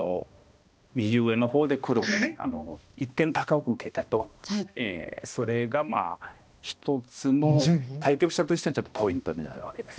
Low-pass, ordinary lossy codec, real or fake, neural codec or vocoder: none; none; fake; codec, 16 kHz, 1 kbps, X-Codec, HuBERT features, trained on balanced general audio